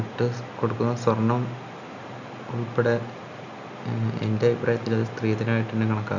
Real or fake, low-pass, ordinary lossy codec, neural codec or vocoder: real; 7.2 kHz; none; none